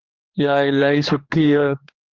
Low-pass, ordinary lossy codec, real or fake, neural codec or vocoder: 7.2 kHz; Opus, 24 kbps; fake; codec, 16 kHz, 2 kbps, X-Codec, HuBERT features, trained on general audio